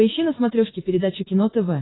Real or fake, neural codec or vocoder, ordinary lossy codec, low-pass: real; none; AAC, 16 kbps; 7.2 kHz